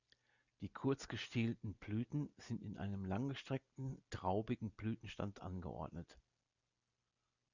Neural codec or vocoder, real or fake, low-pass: none; real; 7.2 kHz